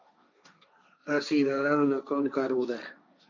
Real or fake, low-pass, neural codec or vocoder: fake; 7.2 kHz; codec, 16 kHz, 1.1 kbps, Voila-Tokenizer